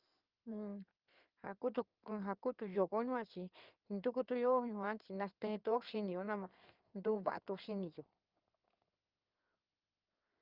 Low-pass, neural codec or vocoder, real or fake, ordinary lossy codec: 5.4 kHz; codec, 16 kHz in and 24 kHz out, 2.2 kbps, FireRedTTS-2 codec; fake; Opus, 24 kbps